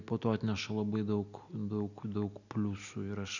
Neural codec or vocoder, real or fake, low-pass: none; real; 7.2 kHz